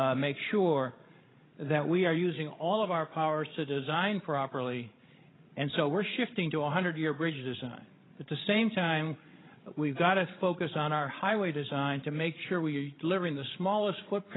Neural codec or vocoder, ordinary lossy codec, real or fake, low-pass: codec, 16 kHz, 16 kbps, FreqCodec, larger model; AAC, 16 kbps; fake; 7.2 kHz